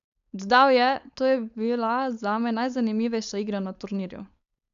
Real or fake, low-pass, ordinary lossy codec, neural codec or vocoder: fake; 7.2 kHz; none; codec, 16 kHz, 4.8 kbps, FACodec